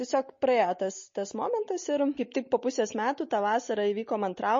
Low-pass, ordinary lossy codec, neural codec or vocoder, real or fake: 7.2 kHz; MP3, 32 kbps; none; real